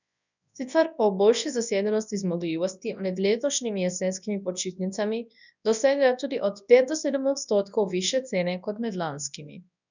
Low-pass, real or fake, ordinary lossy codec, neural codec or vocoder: 7.2 kHz; fake; none; codec, 24 kHz, 0.9 kbps, WavTokenizer, large speech release